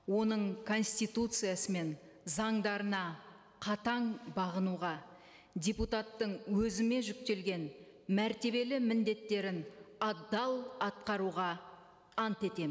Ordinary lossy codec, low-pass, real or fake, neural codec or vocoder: none; none; real; none